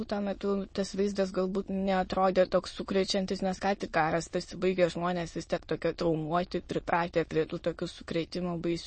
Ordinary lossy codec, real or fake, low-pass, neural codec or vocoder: MP3, 32 kbps; fake; 9.9 kHz; autoencoder, 22.05 kHz, a latent of 192 numbers a frame, VITS, trained on many speakers